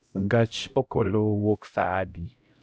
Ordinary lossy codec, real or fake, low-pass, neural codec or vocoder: none; fake; none; codec, 16 kHz, 0.5 kbps, X-Codec, HuBERT features, trained on LibriSpeech